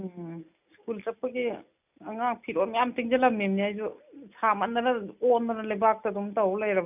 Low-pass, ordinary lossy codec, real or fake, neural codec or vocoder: 3.6 kHz; none; real; none